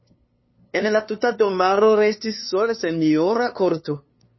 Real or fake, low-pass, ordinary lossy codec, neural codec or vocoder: fake; 7.2 kHz; MP3, 24 kbps; codec, 16 kHz, 2 kbps, FunCodec, trained on LibriTTS, 25 frames a second